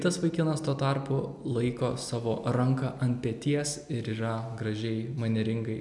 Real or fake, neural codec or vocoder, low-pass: real; none; 10.8 kHz